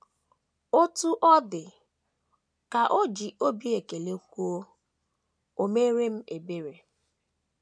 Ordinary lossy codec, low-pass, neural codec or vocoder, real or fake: none; none; none; real